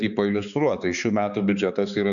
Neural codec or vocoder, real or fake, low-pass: codec, 16 kHz, 4 kbps, X-Codec, HuBERT features, trained on balanced general audio; fake; 7.2 kHz